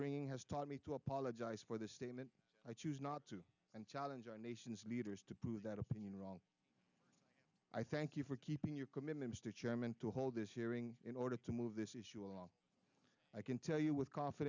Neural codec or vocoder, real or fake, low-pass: none; real; 7.2 kHz